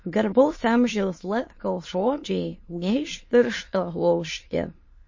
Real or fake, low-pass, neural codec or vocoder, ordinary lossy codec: fake; 7.2 kHz; autoencoder, 22.05 kHz, a latent of 192 numbers a frame, VITS, trained on many speakers; MP3, 32 kbps